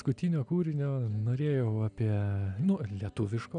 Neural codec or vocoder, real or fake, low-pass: none; real; 9.9 kHz